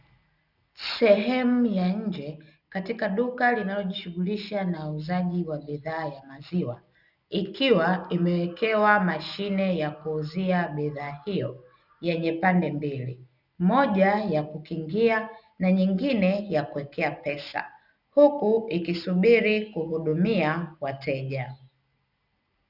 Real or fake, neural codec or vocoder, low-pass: real; none; 5.4 kHz